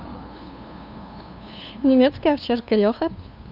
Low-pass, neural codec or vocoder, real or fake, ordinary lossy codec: 5.4 kHz; codec, 16 kHz, 2 kbps, FunCodec, trained on LibriTTS, 25 frames a second; fake; none